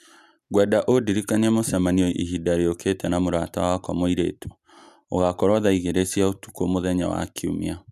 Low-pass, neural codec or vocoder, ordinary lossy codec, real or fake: 14.4 kHz; none; none; real